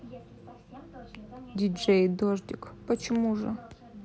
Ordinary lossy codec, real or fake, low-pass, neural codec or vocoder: none; real; none; none